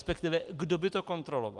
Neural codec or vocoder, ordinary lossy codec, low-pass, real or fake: none; Opus, 32 kbps; 10.8 kHz; real